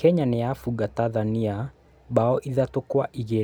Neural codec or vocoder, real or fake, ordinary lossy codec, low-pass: none; real; none; none